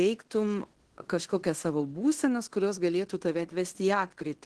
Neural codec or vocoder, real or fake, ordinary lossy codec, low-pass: codec, 16 kHz in and 24 kHz out, 0.9 kbps, LongCat-Audio-Codec, fine tuned four codebook decoder; fake; Opus, 16 kbps; 10.8 kHz